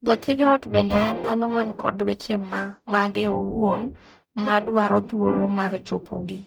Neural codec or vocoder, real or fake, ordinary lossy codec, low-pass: codec, 44.1 kHz, 0.9 kbps, DAC; fake; none; none